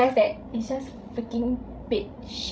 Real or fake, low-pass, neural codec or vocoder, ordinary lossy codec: fake; none; codec, 16 kHz, 16 kbps, FreqCodec, larger model; none